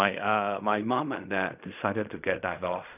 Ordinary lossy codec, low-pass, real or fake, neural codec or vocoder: none; 3.6 kHz; fake; codec, 16 kHz in and 24 kHz out, 0.4 kbps, LongCat-Audio-Codec, fine tuned four codebook decoder